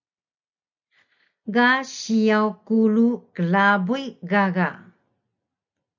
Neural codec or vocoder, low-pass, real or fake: none; 7.2 kHz; real